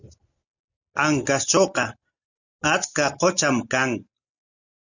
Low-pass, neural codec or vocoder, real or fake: 7.2 kHz; none; real